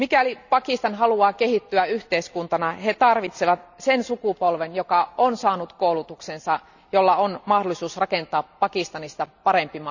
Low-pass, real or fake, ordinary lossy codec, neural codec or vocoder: 7.2 kHz; real; none; none